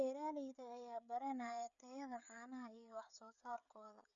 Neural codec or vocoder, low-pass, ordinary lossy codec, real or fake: codec, 16 kHz, 16 kbps, FreqCodec, smaller model; 7.2 kHz; none; fake